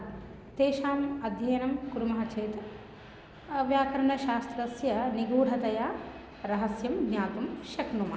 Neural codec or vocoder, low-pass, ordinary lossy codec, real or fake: none; none; none; real